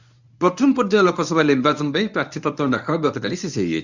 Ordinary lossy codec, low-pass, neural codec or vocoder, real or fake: none; 7.2 kHz; codec, 24 kHz, 0.9 kbps, WavTokenizer, medium speech release version 1; fake